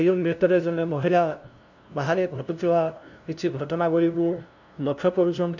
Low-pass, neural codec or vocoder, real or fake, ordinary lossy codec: 7.2 kHz; codec, 16 kHz, 0.5 kbps, FunCodec, trained on LibriTTS, 25 frames a second; fake; none